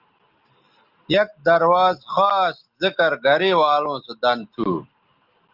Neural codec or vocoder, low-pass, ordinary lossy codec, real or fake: none; 5.4 kHz; Opus, 32 kbps; real